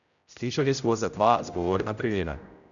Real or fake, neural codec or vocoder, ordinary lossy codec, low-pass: fake; codec, 16 kHz, 0.5 kbps, X-Codec, HuBERT features, trained on general audio; none; 7.2 kHz